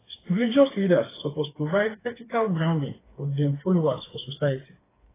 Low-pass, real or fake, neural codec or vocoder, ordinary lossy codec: 3.6 kHz; fake; codec, 16 kHz, 4 kbps, FreqCodec, smaller model; AAC, 16 kbps